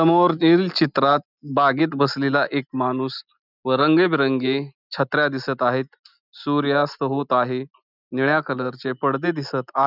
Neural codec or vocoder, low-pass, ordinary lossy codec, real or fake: none; 5.4 kHz; none; real